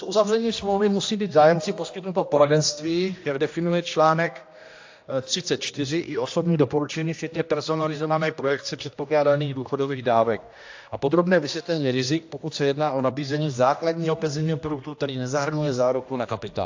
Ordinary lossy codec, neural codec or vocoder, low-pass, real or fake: AAC, 48 kbps; codec, 16 kHz, 1 kbps, X-Codec, HuBERT features, trained on general audio; 7.2 kHz; fake